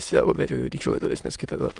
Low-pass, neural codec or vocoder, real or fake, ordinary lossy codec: 9.9 kHz; autoencoder, 22.05 kHz, a latent of 192 numbers a frame, VITS, trained on many speakers; fake; Opus, 32 kbps